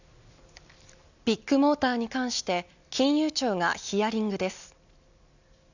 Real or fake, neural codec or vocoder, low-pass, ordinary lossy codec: real; none; 7.2 kHz; none